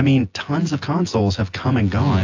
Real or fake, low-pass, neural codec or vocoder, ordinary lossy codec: fake; 7.2 kHz; vocoder, 24 kHz, 100 mel bands, Vocos; AAC, 48 kbps